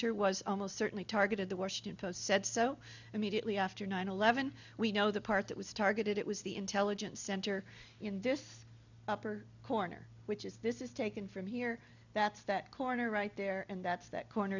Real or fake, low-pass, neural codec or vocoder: real; 7.2 kHz; none